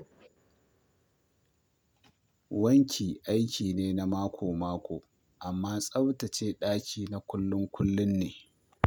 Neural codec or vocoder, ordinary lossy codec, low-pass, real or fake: none; none; none; real